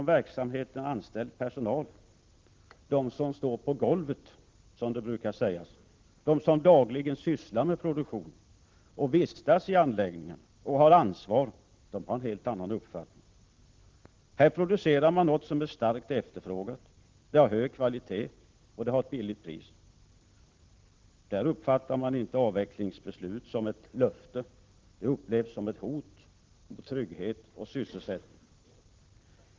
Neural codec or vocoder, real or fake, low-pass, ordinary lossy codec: none; real; 7.2 kHz; Opus, 16 kbps